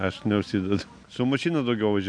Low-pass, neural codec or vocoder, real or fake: 9.9 kHz; none; real